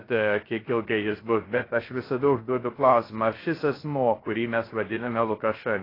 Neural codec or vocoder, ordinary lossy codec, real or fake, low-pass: codec, 16 kHz, 0.3 kbps, FocalCodec; AAC, 24 kbps; fake; 5.4 kHz